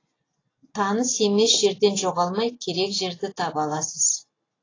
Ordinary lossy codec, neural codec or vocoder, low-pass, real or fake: AAC, 32 kbps; none; 7.2 kHz; real